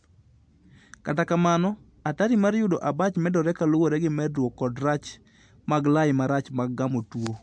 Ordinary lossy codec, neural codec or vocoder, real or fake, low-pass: MP3, 64 kbps; none; real; 9.9 kHz